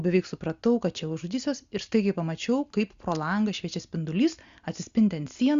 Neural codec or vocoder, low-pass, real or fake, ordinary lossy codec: none; 7.2 kHz; real; Opus, 64 kbps